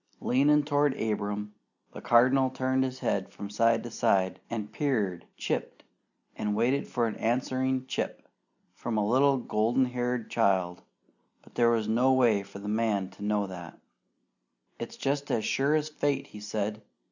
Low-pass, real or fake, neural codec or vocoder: 7.2 kHz; real; none